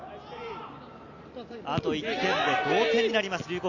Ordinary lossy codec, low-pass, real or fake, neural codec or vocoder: AAC, 48 kbps; 7.2 kHz; real; none